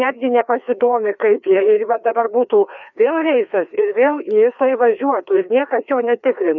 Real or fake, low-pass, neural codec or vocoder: fake; 7.2 kHz; codec, 16 kHz, 2 kbps, FreqCodec, larger model